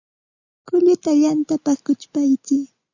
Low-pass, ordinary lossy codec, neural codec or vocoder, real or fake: 7.2 kHz; Opus, 64 kbps; none; real